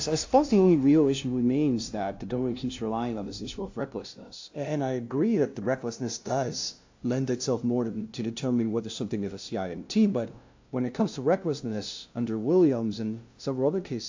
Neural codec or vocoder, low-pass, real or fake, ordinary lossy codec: codec, 16 kHz, 0.5 kbps, FunCodec, trained on LibriTTS, 25 frames a second; 7.2 kHz; fake; AAC, 48 kbps